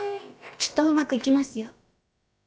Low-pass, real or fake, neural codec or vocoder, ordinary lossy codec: none; fake; codec, 16 kHz, about 1 kbps, DyCAST, with the encoder's durations; none